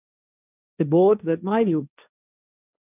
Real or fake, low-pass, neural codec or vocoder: fake; 3.6 kHz; codec, 16 kHz, 1.1 kbps, Voila-Tokenizer